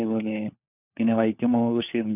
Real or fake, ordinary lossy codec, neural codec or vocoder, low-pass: fake; none; codec, 16 kHz, 4 kbps, FunCodec, trained on LibriTTS, 50 frames a second; 3.6 kHz